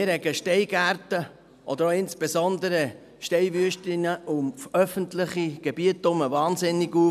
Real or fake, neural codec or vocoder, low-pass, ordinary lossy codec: real; none; 14.4 kHz; none